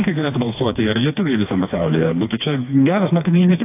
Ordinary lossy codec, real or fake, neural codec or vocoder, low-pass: AAC, 24 kbps; fake; codec, 16 kHz, 2 kbps, FreqCodec, smaller model; 3.6 kHz